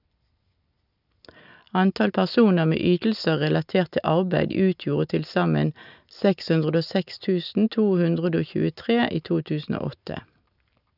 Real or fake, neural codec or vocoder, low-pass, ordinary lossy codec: real; none; 5.4 kHz; none